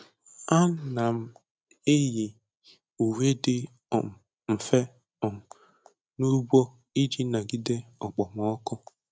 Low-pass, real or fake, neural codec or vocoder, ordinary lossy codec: none; real; none; none